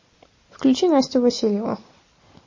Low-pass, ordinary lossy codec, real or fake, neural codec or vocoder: 7.2 kHz; MP3, 32 kbps; real; none